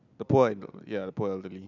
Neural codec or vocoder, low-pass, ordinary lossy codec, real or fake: none; 7.2 kHz; none; real